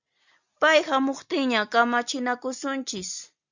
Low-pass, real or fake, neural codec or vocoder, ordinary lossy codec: 7.2 kHz; real; none; Opus, 64 kbps